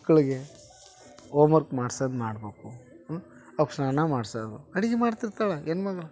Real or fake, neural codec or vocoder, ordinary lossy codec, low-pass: real; none; none; none